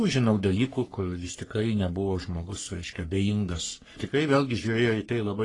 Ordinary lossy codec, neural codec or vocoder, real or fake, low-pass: AAC, 32 kbps; codec, 44.1 kHz, 3.4 kbps, Pupu-Codec; fake; 10.8 kHz